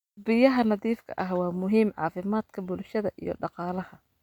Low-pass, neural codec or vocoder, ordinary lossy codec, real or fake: 19.8 kHz; none; none; real